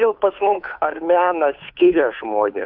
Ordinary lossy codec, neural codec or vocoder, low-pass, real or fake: AAC, 48 kbps; codec, 16 kHz, 2 kbps, FunCodec, trained on Chinese and English, 25 frames a second; 5.4 kHz; fake